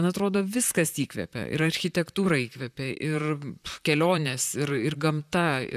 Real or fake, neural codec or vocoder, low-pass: fake; vocoder, 44.1 kHz, 128 mel bands, Pupu-Vocoder; 14.4 kHz